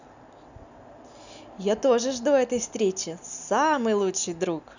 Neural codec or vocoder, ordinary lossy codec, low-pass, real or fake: none; none; 7.2 kHz; real